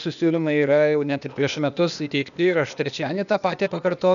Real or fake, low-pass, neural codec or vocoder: fake; 7.2 kHz; codec, 16 kHz, 0.8 kbps, ZipCodec